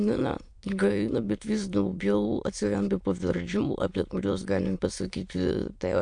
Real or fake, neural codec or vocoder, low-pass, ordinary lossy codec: fake; autoencoder, 22.05 kHz, a latent of 192 numbers a frame, VITS, trained on many speakers; 9.9 kHz; MP3, 64 kbps